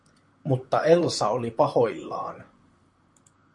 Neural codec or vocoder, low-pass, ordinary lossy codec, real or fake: vocoder, 44.1 kHz, 128 mel bands, Pupu-Vocoder; 10.8 kHz; MP3, 64 kbps; fake